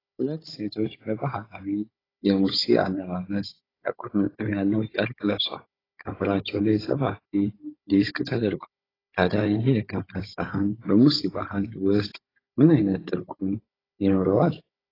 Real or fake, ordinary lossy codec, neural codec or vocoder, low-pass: fake; AAC, 24 kbps; codec, 16 kHz, 16 kbps, FunCodec, trained on Chinese and English, 50 frames a second; 5.4 kHz